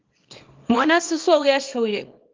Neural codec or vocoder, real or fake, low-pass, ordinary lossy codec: codec, 24 kHz, 0.9 kbps, WavTokenizer, small release; fake; 7.2 kHz; Opus, 32 kbps